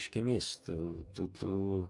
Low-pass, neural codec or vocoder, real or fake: 10.8 kHz; codec, 44.1 kHz, 2.6 kbps, DAC; fake